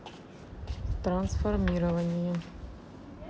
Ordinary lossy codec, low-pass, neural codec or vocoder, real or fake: none; none; none; real